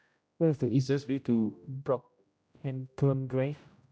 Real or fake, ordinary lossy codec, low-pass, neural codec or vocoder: fake; none; none; codec, 16 kHz, 0.5 kbps, X-Codec, HuBERT features, trained on general audio